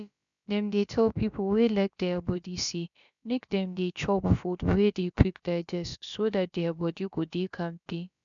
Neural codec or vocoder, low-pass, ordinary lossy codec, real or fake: codec, 16 kHz, about 1 kbps, DyCAST, with the encoder's durations; 7.2 kHz; none; fake